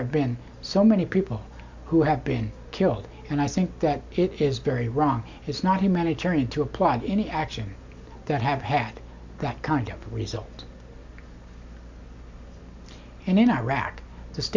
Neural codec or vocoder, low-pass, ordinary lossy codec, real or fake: none; 7.2 kHz; MP3, 64 kbps; real